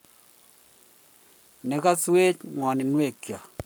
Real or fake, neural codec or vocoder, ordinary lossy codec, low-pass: fake; codec, 44.1 kHz, 7.8 kbps, Pupu-Codec; none; none